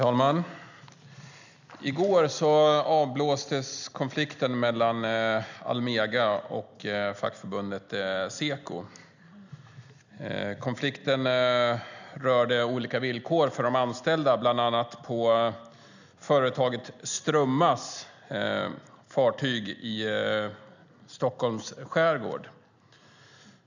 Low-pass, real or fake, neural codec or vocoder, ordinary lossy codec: 7.2 kHz; real; none; none